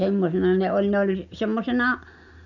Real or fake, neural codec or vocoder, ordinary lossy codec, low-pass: real; none; none; 7.2 kHz